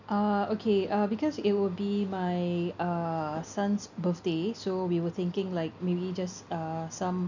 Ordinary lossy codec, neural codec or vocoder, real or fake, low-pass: none; none; real; 7.2 kHz